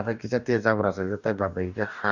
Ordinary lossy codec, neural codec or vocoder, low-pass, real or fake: none; codec, 44.1 kHz, 2.6 kbps, DAC; 7.2 kHz; fake